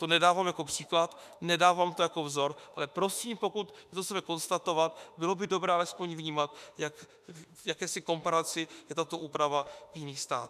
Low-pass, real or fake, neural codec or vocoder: 14.4 kHz; fake; autoencoder, 48 kHz, 32 numbers a frame, DAC-VAE, trained on Japanese speech